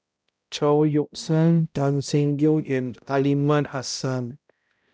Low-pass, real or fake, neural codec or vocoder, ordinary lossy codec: none; fake; codec, 16 kHz, 0.5 kbps, X-Codec, HuBERT features, trained on balanced general audio; none